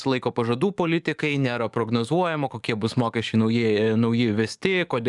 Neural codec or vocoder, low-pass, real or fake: codec, 44.1 kHz, 7.8 kbps, DAC; 10.8 kHz; fake